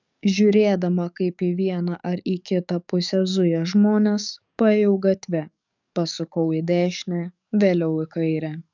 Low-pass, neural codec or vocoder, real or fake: 7.2 kHz; codec, 44.1 kHz, 7.8 kbps, DAC; fake